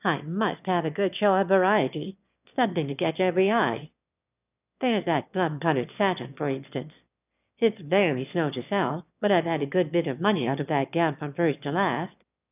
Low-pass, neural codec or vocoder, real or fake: 3.6 kHz; autoencoder, 22.05 kHz, a latent of 192 numbers a frame, VITS, trained on one speaker; fake